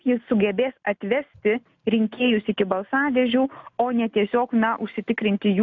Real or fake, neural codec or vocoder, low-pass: real; none; 7.2 kHz